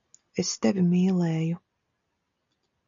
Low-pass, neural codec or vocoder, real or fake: 7.2 kHz; none; real